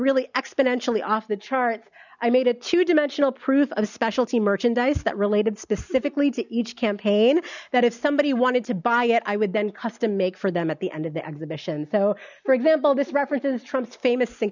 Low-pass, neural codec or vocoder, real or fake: 7.2 kHz; none; real